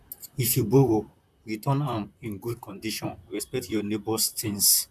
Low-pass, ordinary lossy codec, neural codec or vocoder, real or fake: 14.4 kHz; none; vocoder, 44.1 kHz, 128 mel bands, Pupu-Vocoder; fake